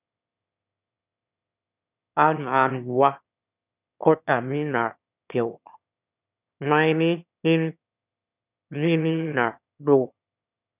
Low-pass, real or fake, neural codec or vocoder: 3.6 kHz; fake; autoencoder, 22.05 kHz, a latent of 192 numbers a frame, VITS, trained on one speaker